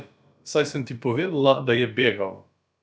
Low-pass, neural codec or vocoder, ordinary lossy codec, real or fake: none; codec, 16 kHz, about 1 kbps, DyCAST, with the encoder's durations; none; fake